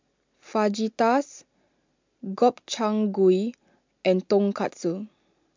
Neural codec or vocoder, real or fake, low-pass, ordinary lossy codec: none; real; 7.2 kHz; MP3, 64 kbps